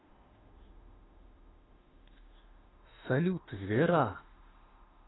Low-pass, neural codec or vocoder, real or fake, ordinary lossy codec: 7.2 kHz; autoencoder, 48 kHz, 32 numbers a frame, DAC-VAE, trained on Japanese speech; fake; AAC, 16 kbps